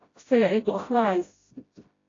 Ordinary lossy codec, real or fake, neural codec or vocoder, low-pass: AAC, 32 kbps; fake; codec, 16 kHz, 0.5 kbps, FreqCodec, smaller model; 7.2 kHz